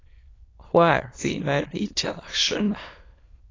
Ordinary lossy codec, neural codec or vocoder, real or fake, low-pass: AAC, 32 kbps; autoencoder, 22.05 kHz, a latent of 192 numbers a frame, VITS, trained on many speakers; fake; 7.2 kHz